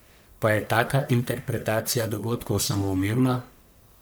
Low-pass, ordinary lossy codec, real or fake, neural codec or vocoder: none; none; fake; codec, 44.1 kHz, 1.7 kbps, Pupu-Codec